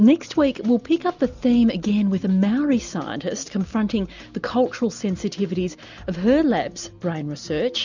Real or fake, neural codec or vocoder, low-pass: real; none; 7.2 kHz